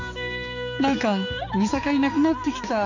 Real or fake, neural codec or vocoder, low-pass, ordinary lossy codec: fake; codec, 16 kHz, 4 kbps, X-Codec, HuBERT features, trained on balanced general audio; 7.2 kHz; none